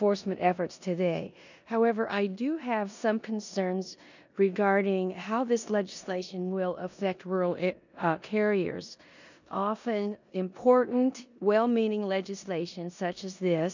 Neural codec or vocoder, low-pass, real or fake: codec, 16 kHz in and 24 kHz out, 0.9 kbps, LongCat-Audio-Codec, four codebook decoder; 7.2 kHz; fake